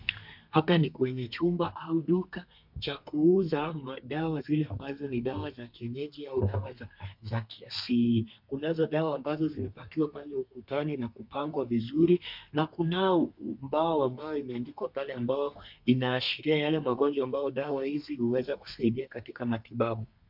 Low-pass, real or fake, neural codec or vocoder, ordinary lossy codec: 5.4 kHz; fake; codec, 32 kHz, 1.9 kbps, SNAC; MP3, 48 kbps